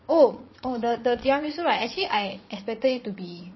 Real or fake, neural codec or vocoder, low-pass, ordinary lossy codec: fake; vocoder, 22.05 kHz, 80 mel bands, Vocos; 7.2 kHz; MP3, 24 kbps